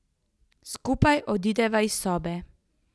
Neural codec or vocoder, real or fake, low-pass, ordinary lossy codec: none; real; none; none